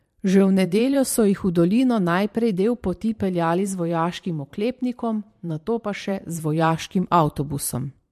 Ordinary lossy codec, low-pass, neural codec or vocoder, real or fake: MP3, 64 kbps; 14.4 kHz; vocoder, 44.1 kHz, 128 mel bands every 512 samples, BigVGAN v2; fake